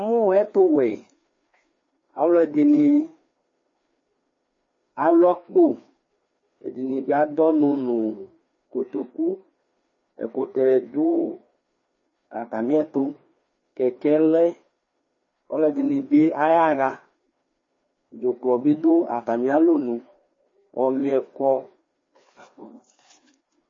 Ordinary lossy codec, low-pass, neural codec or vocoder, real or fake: MP3, 32 kbps; 7.2 kHz; codec, 16 kHz, 2 kbps, FreqCodec, larger model; fake